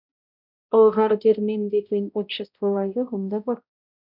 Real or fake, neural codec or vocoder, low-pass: fake; codec, 16 kHz, 0.5 kbps, X-Codec, HuBERT features, trained on balanced general audio; 5.4 kHz